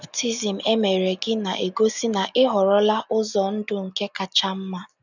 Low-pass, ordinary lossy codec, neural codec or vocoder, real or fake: 7.2 kHz; none; none; real